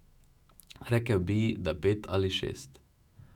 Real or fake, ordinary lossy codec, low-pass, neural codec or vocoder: fake; none; 19.8 kHz; autoencoder, 48 kHz, 128 numbers a frame, DAC-VAE, trained on Japanese speech